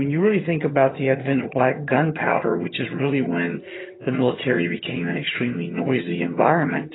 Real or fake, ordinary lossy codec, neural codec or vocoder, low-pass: fake; AAC, 16 kbps; vocoder, 22.05 kHz, 80 mel bands, HiFi-GAN; 7.2 kHz